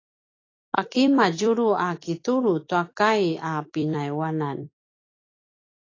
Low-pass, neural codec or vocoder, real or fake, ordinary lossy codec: 7.2 kHz; vocoder, 44.1 kHz, 128 mel bands every 256 samples, BigVGAN v2; fake; AAC, 32 kbps